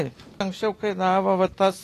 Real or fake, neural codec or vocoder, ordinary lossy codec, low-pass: real; none; AAC, 48 kbps; 14.4 kHz